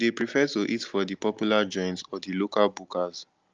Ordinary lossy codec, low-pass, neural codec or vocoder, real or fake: Opus, 24 kbps; 7.2 kHz; none; real